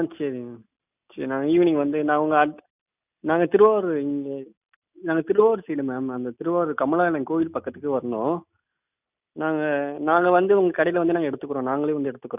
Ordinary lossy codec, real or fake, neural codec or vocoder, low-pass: none; real; none; 3.6 kHz